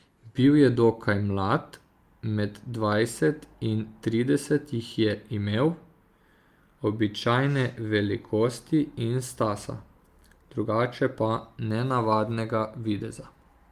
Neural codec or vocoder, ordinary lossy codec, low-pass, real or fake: none; Opus, 32 kbps; 14.4 kHz; real